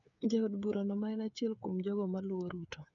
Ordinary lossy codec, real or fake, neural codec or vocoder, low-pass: none; fake; codec, 16 kHz, 8 kbps, FreqCodec, smaller model; 7.2 kHz